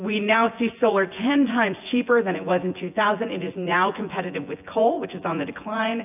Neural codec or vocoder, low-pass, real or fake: vocoder, 24 kHz, 100 mel bands, Vocos; 3.6 kHz; fake